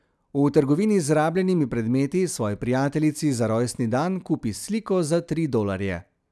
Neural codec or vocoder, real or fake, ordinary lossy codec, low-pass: none; real; none; none